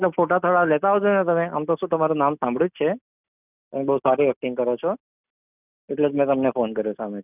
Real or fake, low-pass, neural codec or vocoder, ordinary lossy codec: real; 3.6 kHz; none; none